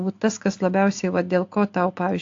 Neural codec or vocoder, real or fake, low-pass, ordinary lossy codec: none; real; 7.2 kHz; AAC, 48 kbps